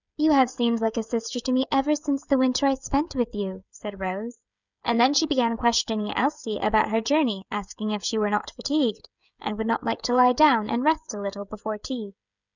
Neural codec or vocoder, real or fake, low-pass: codec, 16 kHz, 16 kbps, FreqCodec, smaller model; fake; 7.2 kHz